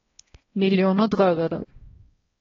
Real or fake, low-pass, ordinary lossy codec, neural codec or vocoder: fake; 7.2 kHz; AAC, 24 kbps; codec, 16 kHz, 1 kbps, X-Codec, HuBERT features, trained on balanced general audio